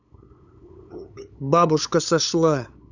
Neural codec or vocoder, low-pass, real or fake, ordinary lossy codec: codec, 16 kHz, 8 kbps, FunCodec, trained on LibriTTS, 25 frames a second; 7.2 kHz; fake; none